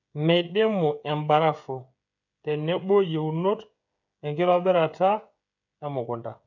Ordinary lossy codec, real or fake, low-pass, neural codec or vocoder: none; fake; 7.2 kHz; codec, 16 kHz, 16 kbps, FreqCodec, smaller model